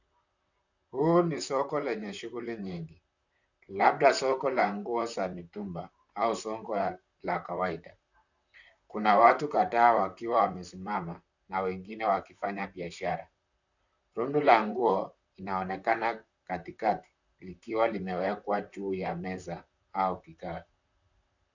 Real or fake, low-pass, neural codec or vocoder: fake; 7.2 kHz; vocoder, 44.1 kHz, 128 mel bands, Pupu-Vocoder